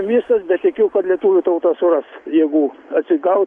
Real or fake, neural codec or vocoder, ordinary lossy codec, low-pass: real; none; AAC, 64 kbps; 10.8 kHz